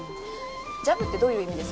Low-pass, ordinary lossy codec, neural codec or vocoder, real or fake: none; none; none; real